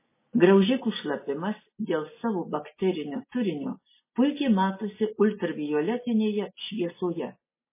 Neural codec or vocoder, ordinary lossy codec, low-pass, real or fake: none; MP3, 16 kbps; 3.6 kHz; real